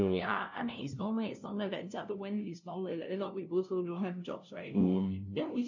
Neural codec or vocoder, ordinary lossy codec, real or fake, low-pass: codec, 16 kHz, 0.5 kbps, FunCodec, trained on LibriTTS, 25 frames a second; none; fake; 7.2 kHz